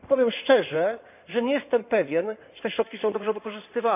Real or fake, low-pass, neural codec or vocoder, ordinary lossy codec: fake; 3.6 kHz; codec, 16 kHz in and 24 kHz out, 2.2 kbps, FireRedTTS-2 codec; none